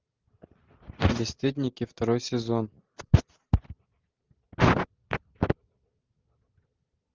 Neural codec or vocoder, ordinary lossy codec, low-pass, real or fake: none; Opus, 32 kbps; 7.2 kHz; real